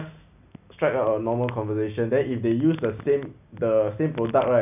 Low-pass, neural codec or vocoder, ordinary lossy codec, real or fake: 3.6 kHz; none; none; real